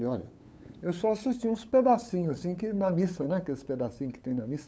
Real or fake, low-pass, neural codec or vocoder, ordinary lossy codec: fake; none; codec, 16 kHz, 8 kbps, FunCodec, trained on LibriTTS, 25 frames a second; none